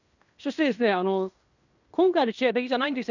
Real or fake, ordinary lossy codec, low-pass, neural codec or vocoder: fake; none; 7.2 kHz; codec, 16 kHz in and 24 kHz out, 0.9 kbps, LongCat-Audio-Codec, fine tuned four codebook decoder